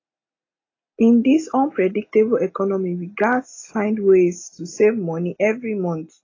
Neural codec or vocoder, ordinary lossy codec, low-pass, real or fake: none; AAC, 32 kbps; 7.2 kHz; real